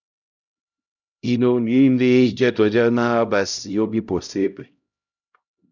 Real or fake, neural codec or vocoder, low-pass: fake; codec, 16 kHz, 0.5 kbps, X-Codec, HuBERT features, trained on LibriSpeech; 7.2 kHz